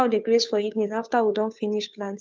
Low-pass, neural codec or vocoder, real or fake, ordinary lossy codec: none; codec, 16 kHz, 2 kbps, FunCodec, trained on Chinese and English, 25 frames a second; fake; none